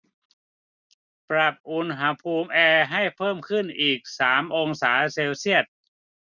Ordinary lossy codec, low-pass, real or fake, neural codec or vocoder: none; 7.2 kHz; real; none